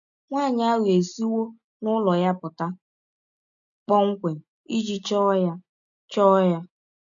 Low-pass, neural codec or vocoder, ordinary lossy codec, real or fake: 7.2 kHz; none; none; real